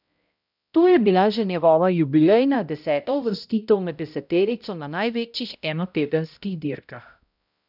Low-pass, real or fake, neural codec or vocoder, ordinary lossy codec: 5.4 kHz; fake; codec, 16 kHz, 0.5 kbps, X-Codec, HuBERT features, trained on balanced general audio; none